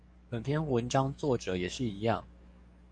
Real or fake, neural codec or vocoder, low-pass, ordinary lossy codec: fake; codec, 44.1 kHz, 7.8 kbps, DAC; 9.9 kHz; Opus, 64 kbps